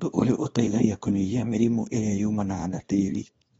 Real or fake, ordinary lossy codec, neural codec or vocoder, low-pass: fake; AAC, 24 kbps; codec, 24 kHz, 0.9 kbps, WavTokenizer, small release; 10.8 kHz